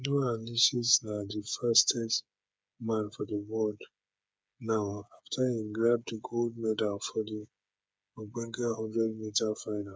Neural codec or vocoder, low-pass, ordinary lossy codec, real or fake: codec, 16 kHz, 8 kbps, FreqCodec, smaller model; none; none; fake